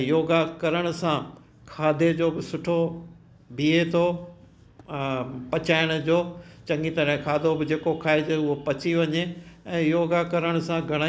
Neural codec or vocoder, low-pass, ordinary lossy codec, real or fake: none; none; none; real